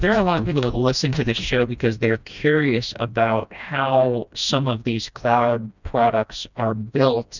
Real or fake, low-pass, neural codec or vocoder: fake; 7.2 kHz; codec, 16 kHz, 1 kbps, FreqCodec, smaller model